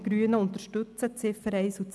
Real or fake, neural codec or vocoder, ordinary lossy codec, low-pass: real; none; none; none